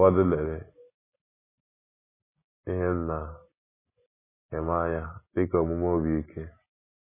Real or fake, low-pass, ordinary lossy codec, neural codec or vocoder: real; 3.6 kHz; MP3, 16 kbps; none